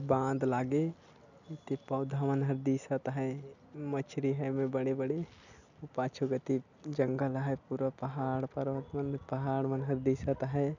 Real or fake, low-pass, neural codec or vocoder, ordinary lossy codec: real; 7.2 kHz; none; none